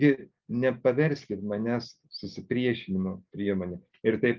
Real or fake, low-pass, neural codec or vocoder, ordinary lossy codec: real; 7.2 kHz; none; Opus, 24 kbps